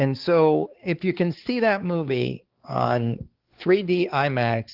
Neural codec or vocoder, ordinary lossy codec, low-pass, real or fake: codec, 16 kHz, 4 kbps, X-Codec, HuBERT features, trained on balanced general audio; Opus, 16 kbps; 5.4 kHz; fake